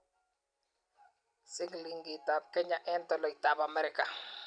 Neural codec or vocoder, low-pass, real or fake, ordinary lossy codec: none; 9.9 kHz; real; none